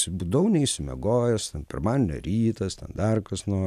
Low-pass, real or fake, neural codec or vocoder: 14.4 kHz; real; none